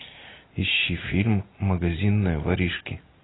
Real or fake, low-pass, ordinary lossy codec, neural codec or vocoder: real; 7.2 kHz; AAC, 16 kbps; none